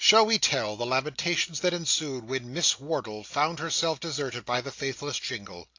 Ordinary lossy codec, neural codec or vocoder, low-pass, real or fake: AAC, 48 kbps; none; 7.2 kHz; real